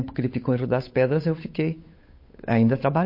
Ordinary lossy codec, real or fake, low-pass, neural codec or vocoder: MP3, 32 kbps; fake; 5.4 kHz; codec, 16 kHz, 8 kbps, FunCodec, trained on Chinese and English, 25 frames a second